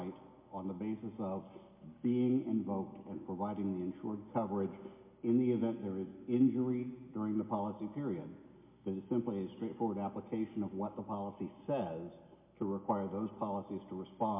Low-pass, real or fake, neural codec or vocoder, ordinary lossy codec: 3.6 kHz; real; none; MP3, 32 kbps